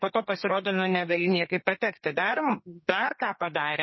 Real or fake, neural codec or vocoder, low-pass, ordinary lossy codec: fake; codec, 32 kHz, 1.9 kbps, SNAC; 7.2 kHz; MP3, 24 kbps